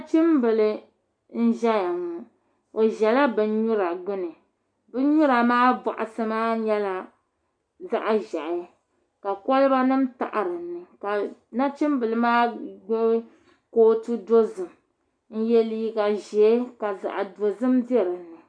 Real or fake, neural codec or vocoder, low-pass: real; none; 9.9 kHz